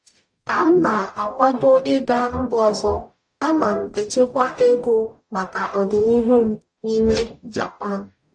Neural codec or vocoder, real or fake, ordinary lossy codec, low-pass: codec, 44.1 kHz, 0.9 kbps, DAC; fake; AAC, 64 kbps; 9.9 kHz